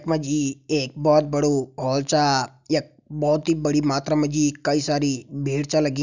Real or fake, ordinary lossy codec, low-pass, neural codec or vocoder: real; none; 7.2 kHz; none